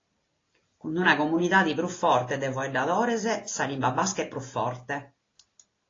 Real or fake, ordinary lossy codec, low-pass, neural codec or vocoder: real; AAC, 32 kbps; 7.2 kHz; none